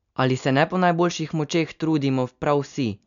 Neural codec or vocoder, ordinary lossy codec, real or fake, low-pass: none; none; real; 7.2 kHz